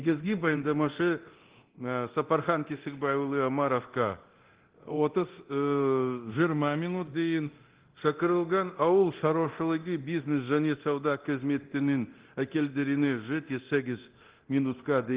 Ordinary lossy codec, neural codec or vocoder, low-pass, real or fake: Opus, 16 kbps; codec, 24 kHz, 0.9 kbps, DualCodec; 3.6 kHz; fake